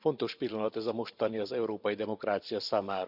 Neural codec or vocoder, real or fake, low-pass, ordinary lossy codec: vocoder, 44.1 kHz, 128 mel bands every 512 samples, BigVGAN v2; fake; 5.4 kHz; none